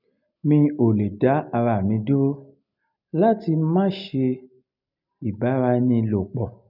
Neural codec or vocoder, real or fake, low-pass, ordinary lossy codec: none; real; 5.4 kHz; none